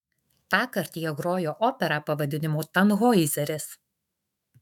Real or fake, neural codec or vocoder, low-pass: fake; autoencoder, 48 kHz, 128 numbers a frame, DAC-VAE, trained on Japanese speech; 19.8 kHz